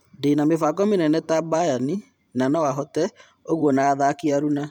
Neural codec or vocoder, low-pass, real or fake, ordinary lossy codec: vocoder, 44.1 kHz, 128 mel bands every 512 samples, BigVGAN v2; 19.8 kHz; fake; none